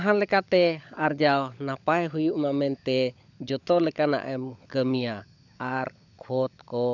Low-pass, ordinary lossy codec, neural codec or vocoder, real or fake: 7.2 kHz; none; codec, 16 kHz, 16 kbps, FunCodec, trained on LibriTTS, 50 frames a second; fake